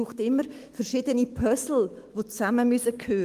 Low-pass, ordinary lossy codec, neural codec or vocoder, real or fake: 14.4 kHz; Opus, 24 kbps; autoencoder, 48 kHz, 128 numbers a frame, DAC-VAE, trained on Japanese speech; fake